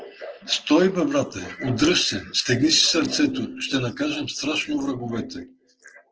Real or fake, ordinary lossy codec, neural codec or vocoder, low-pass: real; Opus, 16 kbps; none; 7.2 kHz